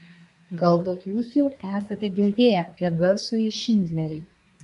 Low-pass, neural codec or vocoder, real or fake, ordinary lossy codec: 10.8 kHz; codec, 24 kHz, 1 kbps, SNAC; fake; MP3, 64 kbps